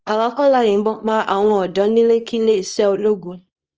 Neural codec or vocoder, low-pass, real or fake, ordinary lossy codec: codec, 24 kHz, 0.9 kbps, WavTokenizer, small release; 7.2 kHz; fake; Opus, 24 kbps